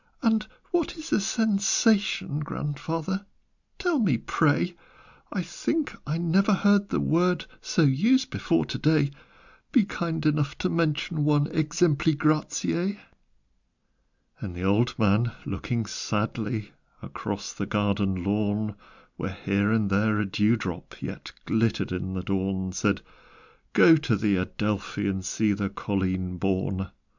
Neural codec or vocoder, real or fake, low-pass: none; real; 7.2 kHz